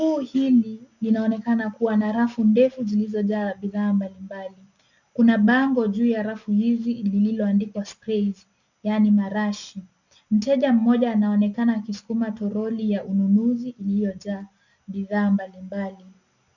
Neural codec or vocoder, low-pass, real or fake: none; 7.2 kHz; real